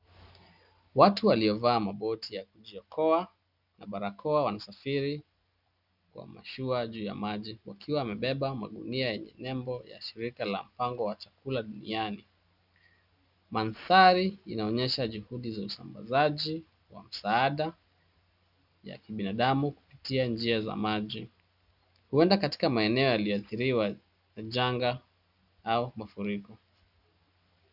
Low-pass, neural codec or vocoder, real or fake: 5.4 kHz; none; real